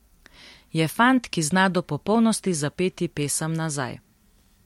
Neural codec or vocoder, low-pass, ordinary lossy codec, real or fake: vocoder, 44.1 kHz, 128 mel bands every 256 samples, BigVGAN v2; 19.8 kHz; MP3, 64 kbps; fake